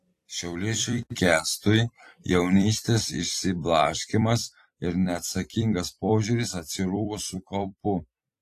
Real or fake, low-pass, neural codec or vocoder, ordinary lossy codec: fake; 14.4 kHz; vocoder, 44.1 kHz, 128 mel bands every 256 samples, BigVGAN v2; AAC, 48 kbps